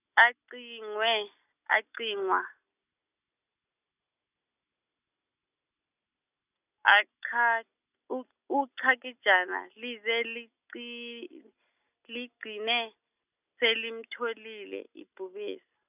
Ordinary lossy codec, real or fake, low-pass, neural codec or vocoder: none; real; 3.6 kHz; none